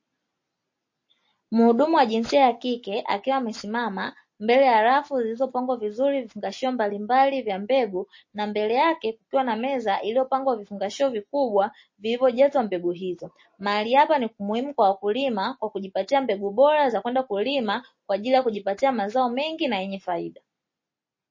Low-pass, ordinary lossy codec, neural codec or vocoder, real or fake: 7.2 kHz; MP3, 32 kbps; none; real